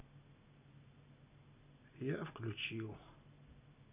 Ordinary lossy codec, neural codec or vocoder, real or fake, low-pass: none; none; real; 3.6 kHz